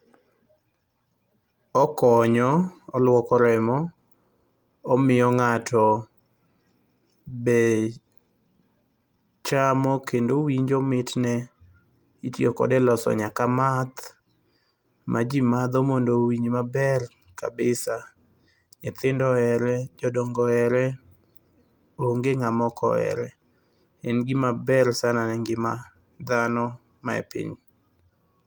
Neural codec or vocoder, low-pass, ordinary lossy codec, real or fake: none; 19.8 kHz; Opus, 32 kbps; real